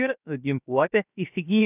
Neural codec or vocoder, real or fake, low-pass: codec, 16 kHz, 0.7 kbps, FocalCodec; fake; 3.6 kHz